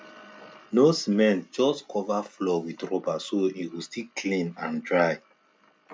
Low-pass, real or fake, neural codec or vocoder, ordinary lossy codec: none; real; none; none